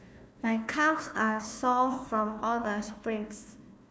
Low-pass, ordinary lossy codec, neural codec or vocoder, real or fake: none; none; codec, 16 kHz, 1 kbps, FunCodec, trained on Chinese and English, 50 frames a second; fake